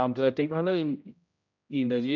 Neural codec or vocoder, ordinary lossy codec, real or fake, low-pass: codec, 16 kHz, 0.5 kbps, X-Codec, HuBERT features, trained on general audio; none; fake; 7.2 kHz